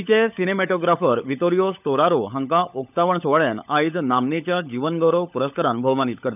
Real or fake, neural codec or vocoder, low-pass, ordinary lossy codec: fake; codec, 16 kHz, 16 kbps, FunCodec, trained on LibriTTS, 50 frames a second; 3.6 kHz; none